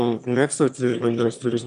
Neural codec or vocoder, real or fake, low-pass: autoencoder, 22.05 kHz, a latent of 192 numbers a frame, VITS, trained on one speaker; fake; 9.9 kHz